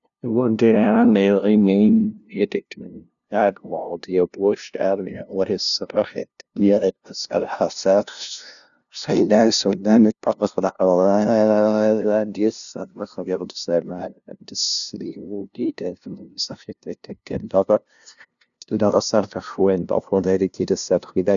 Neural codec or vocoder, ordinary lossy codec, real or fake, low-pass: codec, 16 kHz, 0.5 kbps, FunCodec, trained on LibriTTS, 25 frames a second; none; fake; 7.2 kHz